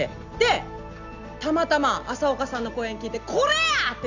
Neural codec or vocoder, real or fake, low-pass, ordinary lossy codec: none; real; 7.2 kHz; none